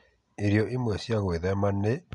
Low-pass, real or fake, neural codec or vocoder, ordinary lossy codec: 10.8 kHz; real; none; none